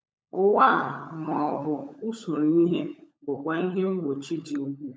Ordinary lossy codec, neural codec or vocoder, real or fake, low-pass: none; codec, 16 kHz, 16 kbps, FunCodec, trained on LibriTTS, 50 frames a second; fake; none